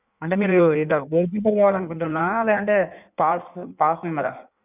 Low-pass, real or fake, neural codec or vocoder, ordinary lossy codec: 3.6 kHz; fake; codec, 16 kHz in and 24 kHz out, 1.1 kbps, FireRedTTS-2 codec; none